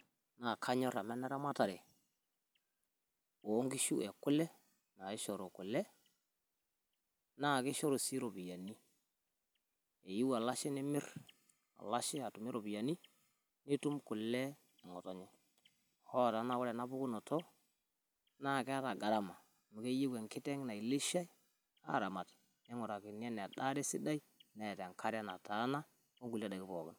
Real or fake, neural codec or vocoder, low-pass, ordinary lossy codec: real; none; none; none